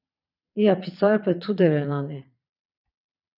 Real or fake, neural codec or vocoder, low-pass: fake; vocoder, 22.05 kHz, 80 mel bands, Vocos; 5.4 kHz